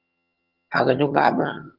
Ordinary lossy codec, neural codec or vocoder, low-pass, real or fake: Opus, 32 kbps; vocoder, 22.05 kHz, 80 mel bands, HiFi-GAN; 5.4 kHz; fake